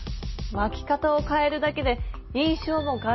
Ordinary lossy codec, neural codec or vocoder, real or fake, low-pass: MP3, 24 kbps; none; real; 7.2 kHz